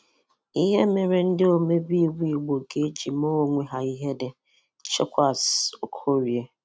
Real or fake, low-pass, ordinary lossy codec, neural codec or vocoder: real; none; none; none